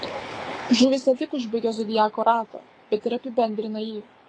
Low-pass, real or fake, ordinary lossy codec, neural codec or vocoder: 9.9 kHz; fake; AAC, 32 kbps; codec, 24 kHz, 6 kbps, HILCodec